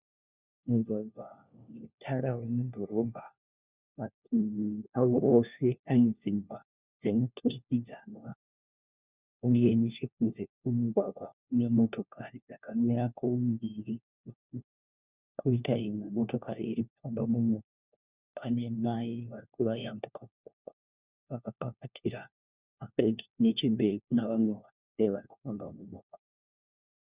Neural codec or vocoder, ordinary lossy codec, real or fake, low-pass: codec, 16 kHz, 1 kbps, FunCodec, trained on LibriTTS, 50 frames a second; Opus, 64 kbps; fake; 3.6 kHz